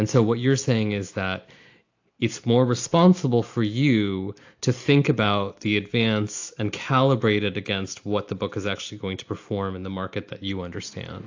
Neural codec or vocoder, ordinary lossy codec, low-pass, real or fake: none; AAC, 48 kbps; 7.2 kHz; real